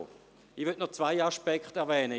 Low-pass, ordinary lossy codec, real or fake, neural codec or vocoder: none; none; real; none